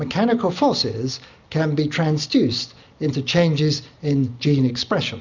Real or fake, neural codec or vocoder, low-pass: real; none; 7.2 kHz